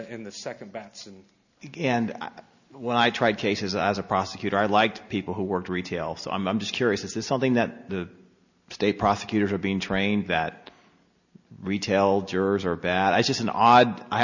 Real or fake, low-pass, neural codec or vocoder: real; 7.2 kHz; none